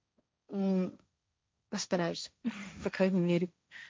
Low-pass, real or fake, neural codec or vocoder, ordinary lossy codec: none; fake; codec, 16 kHz, 1.1 kbps, Voila-Tokenizer; none